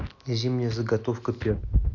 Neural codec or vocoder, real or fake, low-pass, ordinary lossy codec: none; real; 7.2 kHz; none